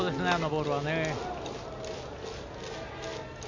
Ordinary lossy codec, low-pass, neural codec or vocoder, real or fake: MP3, 64 kbps; 7.2 kHz; none; real